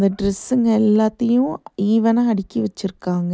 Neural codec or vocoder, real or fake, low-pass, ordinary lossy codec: none; real; none; none